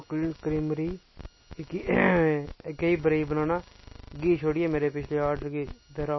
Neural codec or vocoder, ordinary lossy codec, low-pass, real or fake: none; MP3, 24 kbps; 7.2 kHz; real